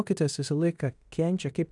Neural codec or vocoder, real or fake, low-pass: codec, 16 kHz in and 24 kHz out, 0.9 kbps, LongCat-Audio-Codec, fine tuned four codebook decoder; fake; 10.8 kHz